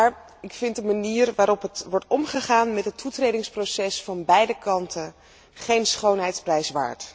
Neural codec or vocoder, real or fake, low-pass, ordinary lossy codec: none; real; none; none